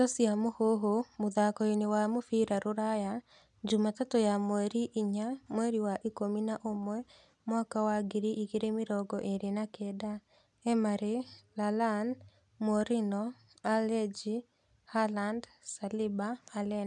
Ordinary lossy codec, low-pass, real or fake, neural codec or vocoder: none; 10.8 kHz; real; none